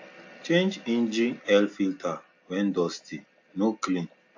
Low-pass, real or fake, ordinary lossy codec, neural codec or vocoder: 7.2 kHz; real; AAC, 48 kbps; none